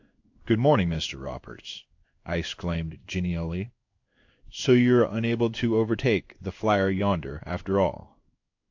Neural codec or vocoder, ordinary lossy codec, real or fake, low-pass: codec, 16 kHz in and 24 kHz out, 1 kbps, XY-Tokenizer; AAC, 48 kbps; fake; 7.2 kHz